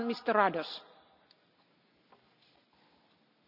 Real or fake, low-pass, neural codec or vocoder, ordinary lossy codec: real; 5.4 kHz; none; none